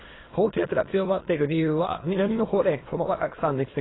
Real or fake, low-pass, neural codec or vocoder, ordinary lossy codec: fake; 7.2 kHz; autoencoder, 22.05 kHz, a latent of 192 numbers a frame, VITS, trained on many speakers; AAC, 16 kbps